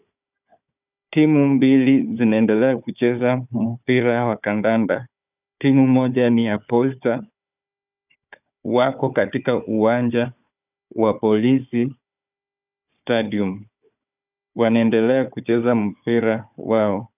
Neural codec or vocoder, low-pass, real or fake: codec, 16 kHz, 4 kbps, FunCodec, trained on Chinese and English, 50 frames a second; 3.6 kHz; fake